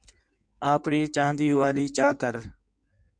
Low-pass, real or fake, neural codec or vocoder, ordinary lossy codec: 9.9 kHz; fake; codec, 16 kHz in and 24 kHz out, 1.1 kbps, FireRedTTS-2 codec; MP3, 64 kbps